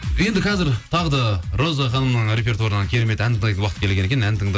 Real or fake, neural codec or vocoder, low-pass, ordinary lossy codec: real; none; none; none